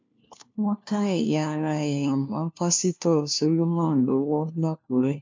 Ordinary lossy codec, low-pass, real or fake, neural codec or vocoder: MP3, 64 kbps; 7.2 kHz; fake; codec, 16 kHz, 1 kbps, FunCodec, trained on LibriTTS, 50 frames a second